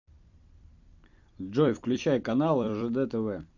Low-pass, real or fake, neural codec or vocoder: 7.2 kHz; fake; vocoder, 44.1 kHz, 128 mel bands every 256 samples, BigVGAN v2